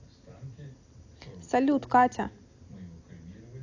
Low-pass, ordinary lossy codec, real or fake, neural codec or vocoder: 7.2 kHz; AAC, 48 kbps; real; none